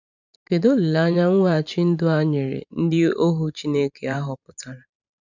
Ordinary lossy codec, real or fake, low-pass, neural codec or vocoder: none; fake; 7.2 kHz; vocoder, 44.1 kHz, 128 mel bands every 512 samples, BigVGAN v2